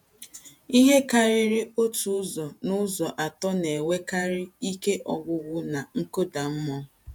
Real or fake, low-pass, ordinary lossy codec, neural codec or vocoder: fake; none; none; vocoder, 48 kHz, 128 mel bands, Vocos